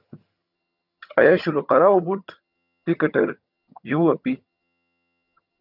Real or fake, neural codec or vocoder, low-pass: fake; vocoder, 22.05 kHz, 80 mel bands, HiFi-GAN; 5.4 kHz